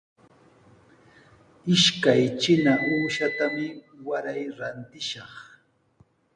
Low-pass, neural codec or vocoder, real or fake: 9.9 kHz; none; real